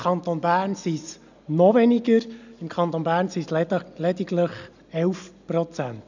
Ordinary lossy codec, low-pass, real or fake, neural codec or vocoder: none; 7.2 kHz; real; none